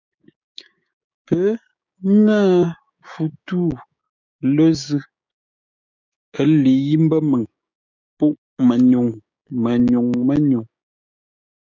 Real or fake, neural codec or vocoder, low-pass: fake; codec, 16 kHz, 6 kbps, DAC; 7.2 kHz